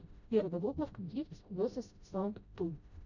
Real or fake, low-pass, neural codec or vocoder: fake; 7.2 kHz; codec, 16 kHz, 0.5 kbps, FreqCodec, smaller model